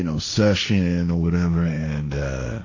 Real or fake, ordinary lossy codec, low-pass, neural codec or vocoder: fake; AAC, 48 kbps; 7.2 kHz; codec, 16 kHz, 1.1 kbps, Voila-Tokenizer